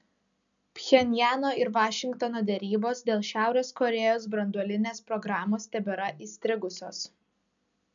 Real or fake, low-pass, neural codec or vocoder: real; 7.2 kHz; none